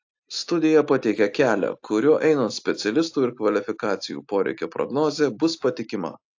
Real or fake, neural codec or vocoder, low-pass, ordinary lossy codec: real; none; 7.2 kHz; AAC, 48 kbps